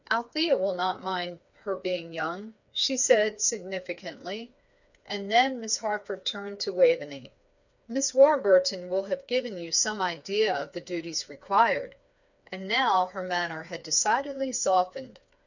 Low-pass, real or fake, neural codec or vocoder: 7.2 kHz; fake; codec, 16 kHz, 4 kbps, FreqCodec, smaller model